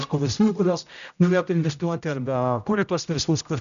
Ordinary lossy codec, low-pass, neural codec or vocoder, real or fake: MP3, 96 kbps; 7.2 kHz; codec, 16 kHz, 0.5 kbps, X-Codec, HuBERT features, trained on general audio; fake